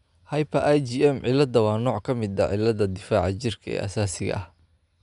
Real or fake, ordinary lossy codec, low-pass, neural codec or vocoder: real; none; 10.8 kHz; none